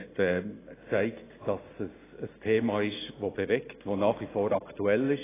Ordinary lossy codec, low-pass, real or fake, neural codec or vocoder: AAC, 16 kbps; 3.6 kHz; real; none